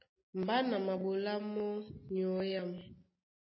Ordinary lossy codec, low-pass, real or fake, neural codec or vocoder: MP3, 24 kbps; 7.2 kHz; real; none